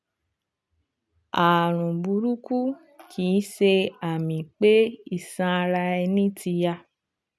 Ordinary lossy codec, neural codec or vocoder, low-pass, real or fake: none; none; none; real